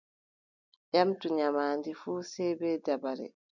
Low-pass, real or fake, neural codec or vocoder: 7.2 kHz; real; none